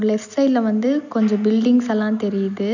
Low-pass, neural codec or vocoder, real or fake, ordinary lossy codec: 7.2 kHz; none; real; none